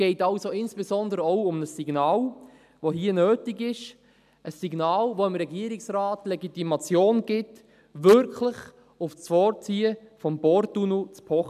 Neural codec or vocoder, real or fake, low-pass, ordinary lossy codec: none; real; 14.4 kHz; none